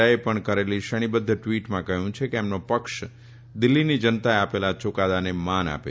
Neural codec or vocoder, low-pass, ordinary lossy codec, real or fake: none; none; none; real